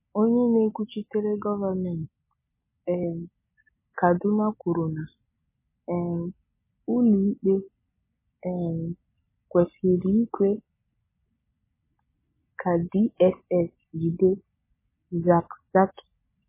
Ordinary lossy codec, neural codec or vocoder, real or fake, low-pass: MP3, 16 kbps; none; real; 3.6 kHz